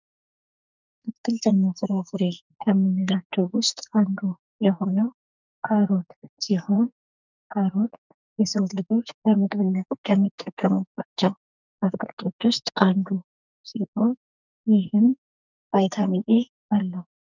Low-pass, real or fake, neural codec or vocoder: 7.2 kHz; fake; codec, 44.1 kHz, 2.6 kbps, SNAC